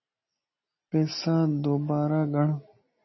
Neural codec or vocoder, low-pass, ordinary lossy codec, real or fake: none; 7.2 kHz; MP3, 24 kbps; real